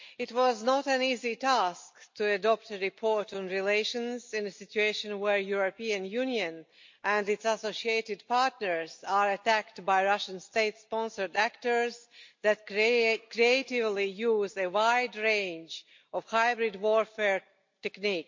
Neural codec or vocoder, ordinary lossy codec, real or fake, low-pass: none; MP3, 48 kbps; real; 7.2 kHz